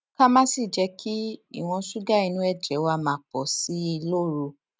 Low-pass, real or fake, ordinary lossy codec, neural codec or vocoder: none; real; none; none